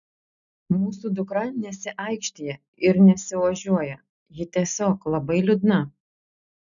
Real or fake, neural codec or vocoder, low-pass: real; none; 7.2 kHz